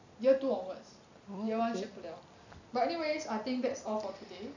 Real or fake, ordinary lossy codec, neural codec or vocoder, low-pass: real; none; none; 7.2 kHz